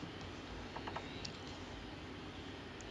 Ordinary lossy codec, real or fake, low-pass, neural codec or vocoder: none; real; none; none